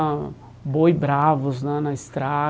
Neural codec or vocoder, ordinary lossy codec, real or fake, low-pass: none; none; real; none